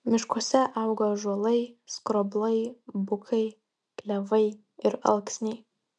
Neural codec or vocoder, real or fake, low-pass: none; real; 9.9 kHz